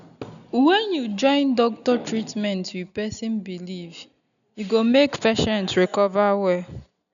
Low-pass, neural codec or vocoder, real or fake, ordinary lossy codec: 7.2 kHz; none; real; none